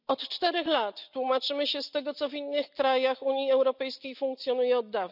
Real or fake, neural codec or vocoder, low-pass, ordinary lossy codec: real; none; 5.4 kHz; none